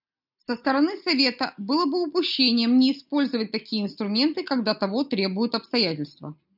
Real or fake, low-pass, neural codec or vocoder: real; 5.4 kHz; none